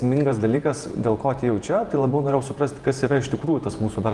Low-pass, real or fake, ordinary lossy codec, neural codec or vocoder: 10.8 kHz; fake; Opus, 24 kbps; vocoder, 48 kHz, 128 mel bands, Vocos